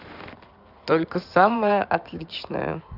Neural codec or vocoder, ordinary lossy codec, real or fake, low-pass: codec, 16 kHz in and 24 kHz out, 2.2 kbps, FireRedTTS-2 codec; none; fake; 5.4 kHz